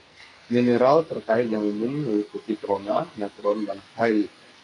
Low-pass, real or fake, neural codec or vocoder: 10.8 kHz; fake; codec, 44.1 kHz, 2.6 kbps, SNAC